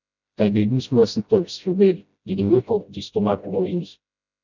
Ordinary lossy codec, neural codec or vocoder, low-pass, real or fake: none; codec, 16 kHz, 0.5 kbps, FreqCodec, smaller model; 7.2 kHz; fake